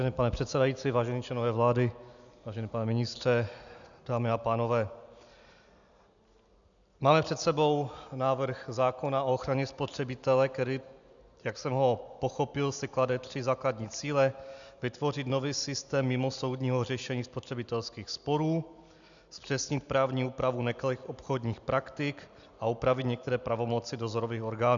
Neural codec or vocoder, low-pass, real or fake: none; 7.2 kHz; real